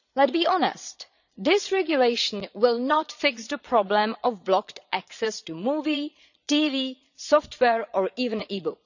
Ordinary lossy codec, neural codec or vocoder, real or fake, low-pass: none; vocoder, 22.05 kHz, 80 mel bands, Vocos; fake; 7.2 kHz